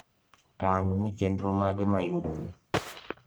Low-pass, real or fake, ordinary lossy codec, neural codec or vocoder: none; fake; none; codec, 44.1 kHz, 1.7 kbps, Pupu-Codec